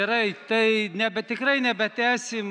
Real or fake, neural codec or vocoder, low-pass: real; none; 9.9 kHz